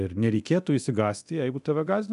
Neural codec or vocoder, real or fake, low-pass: codec, 24 kHz, 0.9 kbps, DualCodec; fake; 10.8 kHz